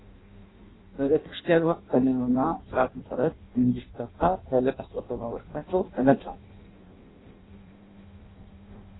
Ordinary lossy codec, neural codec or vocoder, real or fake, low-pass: AAC, 16 kbps; codec, 16 kHz in and 24 kHz out, 0.6 kbps, FireRedTTS-2 codec; fake; 7.2 kHz